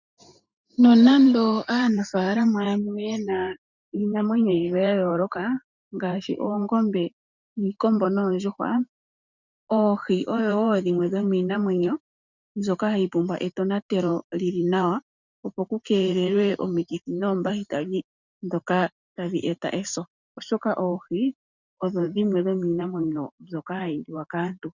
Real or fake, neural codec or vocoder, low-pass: fake; vocoder, 44.1 kHz, 128 mel bands every 512 samples, BigVGAN v2; 7.2 kHz